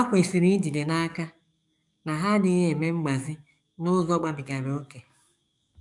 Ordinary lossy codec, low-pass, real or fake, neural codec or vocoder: none; 10.8 kHz; fake; codec, 44.1 kHz, 7.8 kbps, DAC